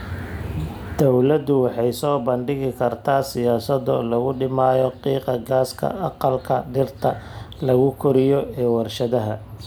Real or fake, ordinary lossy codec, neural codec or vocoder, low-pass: real; none; none; none